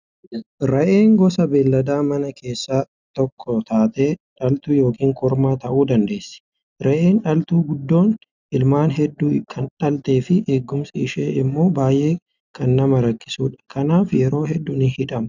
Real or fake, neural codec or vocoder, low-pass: real; none; 7.2 kHz